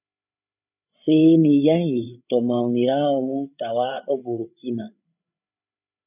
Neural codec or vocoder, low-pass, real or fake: codec, 16 kHz, 8 kbps, FreqCodec, larger model; 3.6 kHz; fake